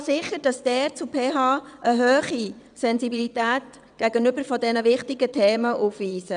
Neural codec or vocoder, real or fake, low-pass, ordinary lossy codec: vocoder, 22.05 kHz, 80 mel bands, WaveNeXt; fake; 9.9 kHz; none